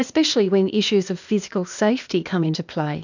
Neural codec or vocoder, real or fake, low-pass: codec, 16 kHz, 0.8 kbps, ZipCodec; fake; 7.2 kHz